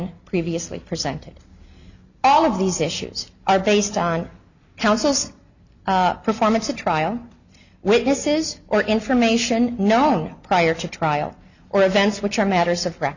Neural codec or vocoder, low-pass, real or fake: none; 7.2 kHz; real